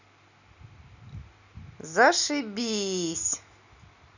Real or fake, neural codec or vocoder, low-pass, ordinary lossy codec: real; none; 7.2 kHz; none